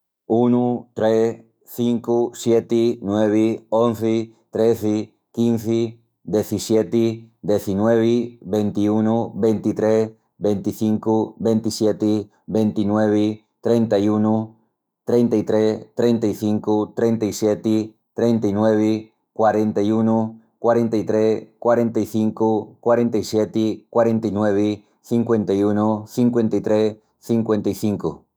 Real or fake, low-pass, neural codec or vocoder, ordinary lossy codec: fake; none; autoencoder, 48 kHz, 128 numbers a frame, DAC-VAE, trained on Japanese speech; none